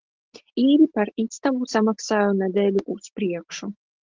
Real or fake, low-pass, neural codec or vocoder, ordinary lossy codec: real; 7.2 kHz; none; Opus, 16 kbps